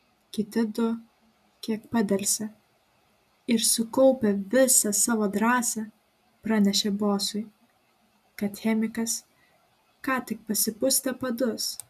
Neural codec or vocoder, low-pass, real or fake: none; 14.4 kHz; real